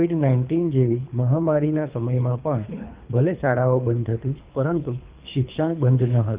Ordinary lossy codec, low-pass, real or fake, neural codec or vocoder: Opus, 24 kbps; 3.6 kHz; fake; codec, 24 kHz, 3 kbps, HILCodec